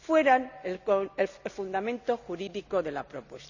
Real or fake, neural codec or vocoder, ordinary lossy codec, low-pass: real; none; none; 7.2 kHz